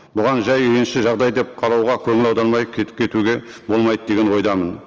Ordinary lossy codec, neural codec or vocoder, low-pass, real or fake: Opus, 24 kbps; none; 7.2 kHz; real